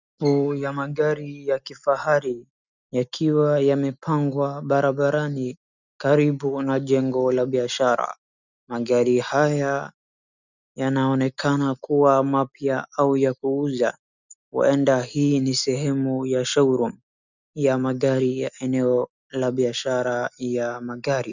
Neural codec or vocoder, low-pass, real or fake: none; 7.2 kHz; real